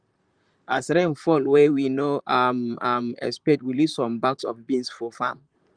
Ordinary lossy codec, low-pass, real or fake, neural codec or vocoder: Opus, 32 kbps; 9.9 kHz; fake; vocoder, 44.1 kHz, 128 mel bands, Pupu-Vocoder